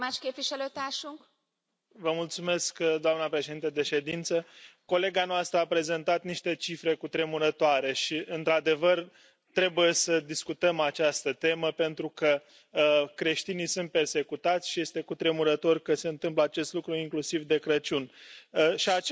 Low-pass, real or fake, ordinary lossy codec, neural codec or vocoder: none; real; none; none